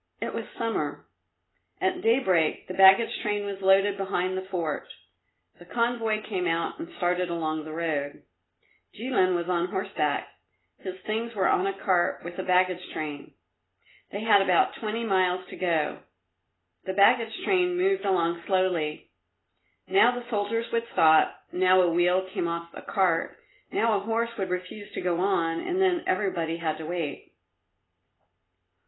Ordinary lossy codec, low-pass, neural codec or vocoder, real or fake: AAC, 16 kbps; 7.2 kHz; none; real